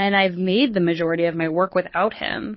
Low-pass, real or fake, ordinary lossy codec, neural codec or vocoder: 7.2 kHz; fake; MP3, 24 kbps; codec, 24 kHz, 6 kbps, HILCodec